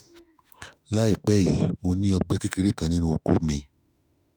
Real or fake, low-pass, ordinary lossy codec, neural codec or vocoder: fake; none; none; autoencoder, 48 kHz, 32 numbers a frame, DAC-VAE, trained on Japanese speech